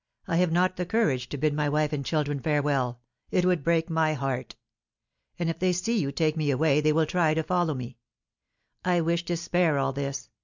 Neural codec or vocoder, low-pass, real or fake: none; 7.2 kHz; real